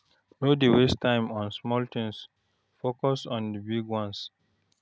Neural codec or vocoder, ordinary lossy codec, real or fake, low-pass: none; none; real; none